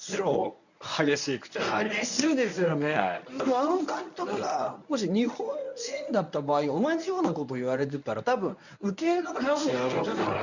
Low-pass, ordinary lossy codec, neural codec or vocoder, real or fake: 7.2 kHz; none; codec, 24 kHz, 0.9 kbps, WavTokenizer, medium speech release version 1; fake